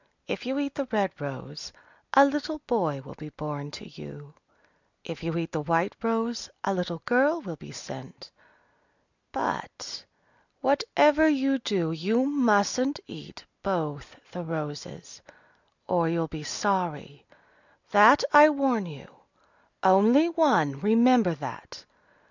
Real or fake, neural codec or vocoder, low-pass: real; none; 7.2 kHz